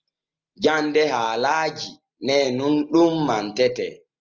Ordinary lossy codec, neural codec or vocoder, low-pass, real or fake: Opus, 32 kbps; none; 7.2 kHz; real